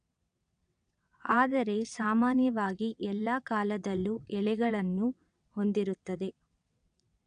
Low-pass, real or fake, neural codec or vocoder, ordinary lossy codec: 9.9 kHz; fake; vocoder, 22.05 kHz, 80 mel bands, WaveNeXt; none